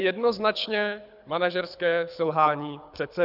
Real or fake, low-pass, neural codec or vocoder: fake; 5.4 kHz; codec, 24 kHz, 6 kbps, HILCodec